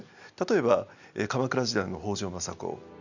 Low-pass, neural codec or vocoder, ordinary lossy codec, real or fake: 7.2 kHz; none; none; real